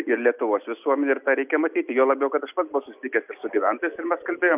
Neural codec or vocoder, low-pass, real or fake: none; 3.6 kHz; real